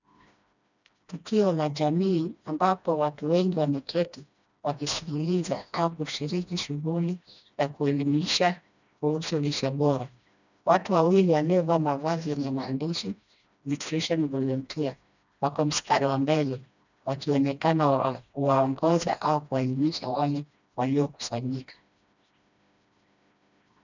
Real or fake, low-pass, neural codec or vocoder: fake; 7.2 kHz; codec, 16 kHz, 1 kbps, FreqCodec, smaller model